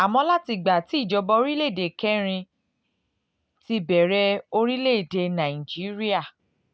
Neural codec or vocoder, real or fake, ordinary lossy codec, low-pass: none; real; none; none